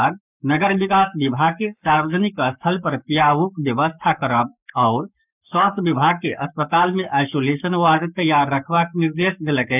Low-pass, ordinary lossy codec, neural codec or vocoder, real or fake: 3.6 kHz; none; codec, 44.1 kHz, 7.8 kbps, Pupu-Codec; fake